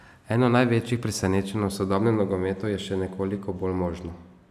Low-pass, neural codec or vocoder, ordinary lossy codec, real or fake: 14.4 kHz; none; none; real